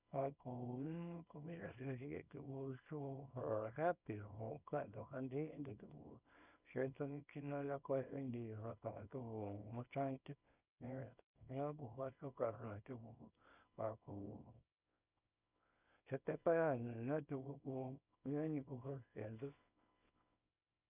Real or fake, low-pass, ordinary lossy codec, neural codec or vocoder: fake; 3.6 kHz; none; codec, 24 kHz, 0.9 kbps, WavTokenizer, small release